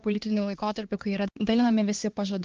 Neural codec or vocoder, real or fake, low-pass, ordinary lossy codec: codec, 16 kHz, 2 kbps, X-Codec, HuBERT features, trained on LibriSpeech; fake; 7.2 kHz; Opus, 16 kbps